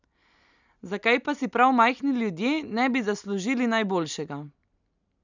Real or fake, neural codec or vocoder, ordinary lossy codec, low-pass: real; none; none; 7.2 kHz